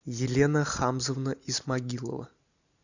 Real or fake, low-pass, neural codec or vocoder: real; 7.2 kHz; none